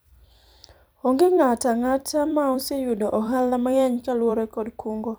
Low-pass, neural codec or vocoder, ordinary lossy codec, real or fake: none; vocoder, 44.1 kHz, 128 mel bands every 256 samples, BigVGAN v2; none; fake